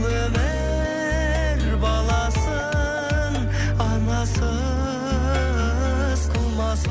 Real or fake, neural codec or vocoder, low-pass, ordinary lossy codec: real; none; none; none